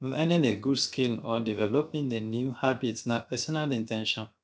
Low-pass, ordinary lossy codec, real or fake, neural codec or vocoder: none; none; fake; codec, 16 kHz, 0.7 kbps, FocalCodec